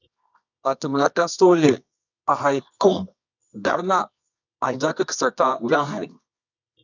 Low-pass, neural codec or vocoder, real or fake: 7.2 kHz; codec, 24 kHz, 0.9 kbps, WavTokenizer, medium music audio release; fake